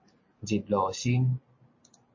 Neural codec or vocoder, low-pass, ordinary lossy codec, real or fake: none; 7.2 kHz; MP3, 32 kbps; real